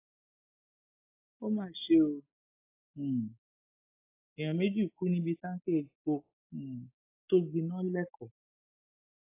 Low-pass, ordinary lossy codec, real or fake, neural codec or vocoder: 3.6 kHz; AAC, 24 kbps; real; none